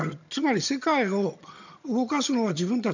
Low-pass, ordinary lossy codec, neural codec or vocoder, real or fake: 7.2 kHz; none; vocoder, 22.05 kHz, 80 mel bands, HiFi-GAN; fake